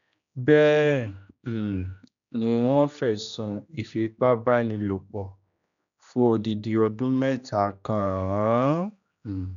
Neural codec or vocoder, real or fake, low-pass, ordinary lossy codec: codec, 16 kHz, 1 kbps, X-Codec, HuBERT features, trained on general audio; fake; 7.2 kHz; none